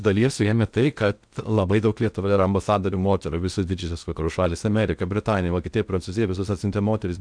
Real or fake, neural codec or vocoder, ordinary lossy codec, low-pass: fake; codec, 16 kHz in and 24 kHz out, 0.6 kbps, FocalCodec, streaming, 2048 codes; MP3, 96 kbps; 9.9 kHz